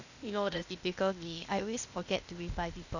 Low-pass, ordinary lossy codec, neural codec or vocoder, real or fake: 7.2 kHz; none; codec, 16 kHz, 0.8 kbps, ZipCodec; fake